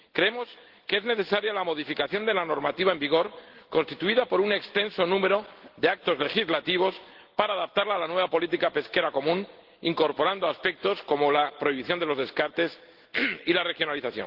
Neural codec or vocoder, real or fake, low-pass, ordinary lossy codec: none; real; 5.4 kHz; Opus, 16 kbps